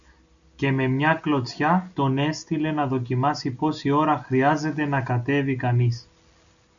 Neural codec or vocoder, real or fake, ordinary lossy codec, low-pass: none; real; MP3, 96 kbps; 7.2 kHz